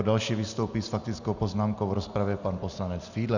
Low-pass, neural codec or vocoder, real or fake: 7.2 kHz; none; real